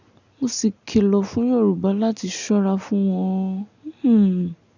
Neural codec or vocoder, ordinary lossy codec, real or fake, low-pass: none; none; real; 7.2 kHz